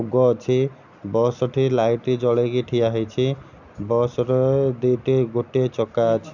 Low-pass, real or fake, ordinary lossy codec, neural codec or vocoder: 7.2 kHz; real; none; none